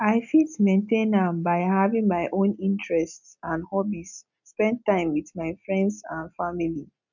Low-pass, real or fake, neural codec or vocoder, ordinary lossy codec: 7.2 kHz; real; none; none